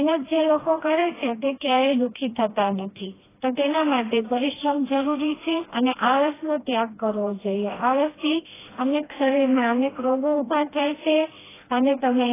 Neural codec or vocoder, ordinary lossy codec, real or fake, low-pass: codec, 16 kHz, 1 kbps, FreqCodec, smaller model; AAC, 16 kbps; fake; 3.6 kHz